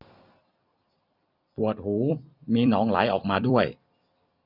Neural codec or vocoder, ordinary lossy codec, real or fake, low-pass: vocoder, 44.1 kHz, 128 mel bands every 256 samples, BigVGAN v2; none; fake; 5.4 kHz